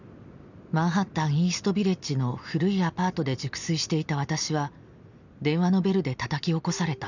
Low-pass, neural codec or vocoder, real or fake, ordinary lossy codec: 7.2 kHz; none; real; none